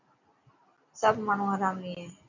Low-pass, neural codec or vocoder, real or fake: 7.2 kHz; none; real